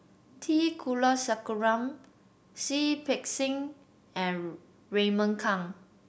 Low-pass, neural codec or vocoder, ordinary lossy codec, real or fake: none; none; none; real